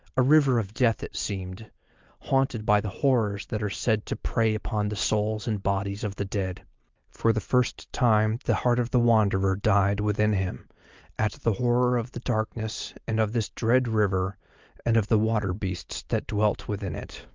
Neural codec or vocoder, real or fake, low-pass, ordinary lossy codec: none; real; 7.2 kHz; Opus, 24 kbps